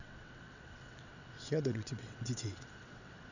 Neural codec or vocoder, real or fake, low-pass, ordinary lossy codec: vocoder, 22.05 kHz, 80 mel bands, WaveNeXt; fake; 7.2 kHz; none